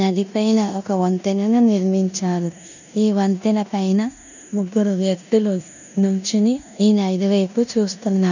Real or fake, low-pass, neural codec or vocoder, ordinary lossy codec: fake; 7.2 kHz; codec, 16 kHz in and 24 kHz out, 0.9 kbps, LongCat-Audio-Codec, four codebook decoder; none